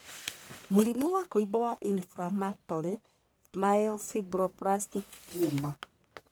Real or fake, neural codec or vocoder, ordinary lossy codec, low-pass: fake; codec, 44.1 kHz, 1.7 kbps, Pupu-Codec; none; none